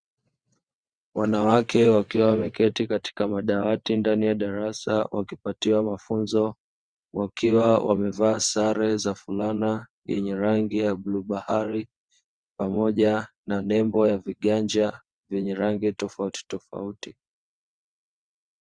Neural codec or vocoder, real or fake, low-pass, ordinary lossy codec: vocoder, 22.05 kHz, 80 mel bands, WaveNeXt; fake; 9.9 kHz; Opus, 64 kbps